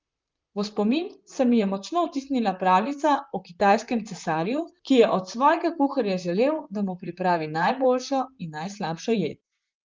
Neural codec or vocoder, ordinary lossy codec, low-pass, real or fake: vocoder, 44.1 kHz, 128 mel bands, Pupu-Vocoder; Opus, 24 kbps; 7.2 kHz; fake